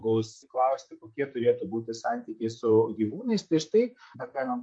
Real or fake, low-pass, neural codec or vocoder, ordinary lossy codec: fake; 9.9 kHz; vocoder, 44.1 kHz, 128 mel bands, Pupu-Vocoder; MP3, 48 kbps